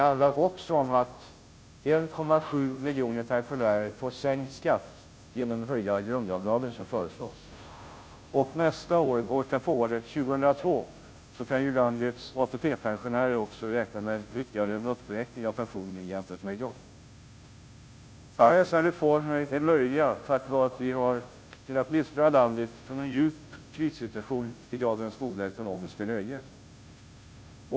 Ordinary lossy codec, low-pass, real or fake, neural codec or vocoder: none; none; fake; codec, 16 kHz, 0.5 kbps, FunCodec, trained on Chinese and English, 25 frames a second